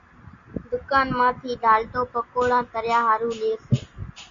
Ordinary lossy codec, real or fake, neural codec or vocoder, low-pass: MP3, 48 kbps; real; none; 7.2 kHz